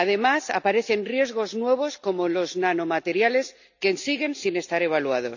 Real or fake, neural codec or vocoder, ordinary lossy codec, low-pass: real; none; none; 7.2 kHz